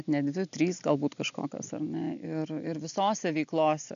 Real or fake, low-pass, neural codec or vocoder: real; 7.2 kHz; none